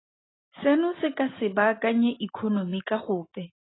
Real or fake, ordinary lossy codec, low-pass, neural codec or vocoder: real; AAC, 16 kbps; 7.2 kHz; none